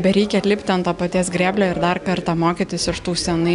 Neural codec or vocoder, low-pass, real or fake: none; 10.8 kHz; real